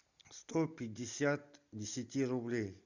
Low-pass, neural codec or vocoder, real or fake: 7.2 kHz; none; real